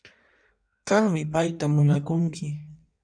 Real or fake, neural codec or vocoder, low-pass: fake; codec, 16 kHz in and 24 kHz out, 1.1 kbps, FireRedTTS-2 codec; 9.9 kHz